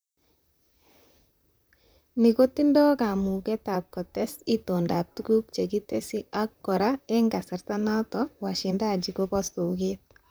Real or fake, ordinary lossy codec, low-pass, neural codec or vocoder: fake; none; none; vocoder, 44.1 kHz, 128 mel bands, Pupu-Vocoder